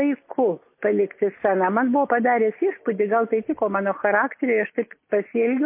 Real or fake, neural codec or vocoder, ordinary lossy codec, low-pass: real; none; MP3, 24 kbps; 3.6 kHz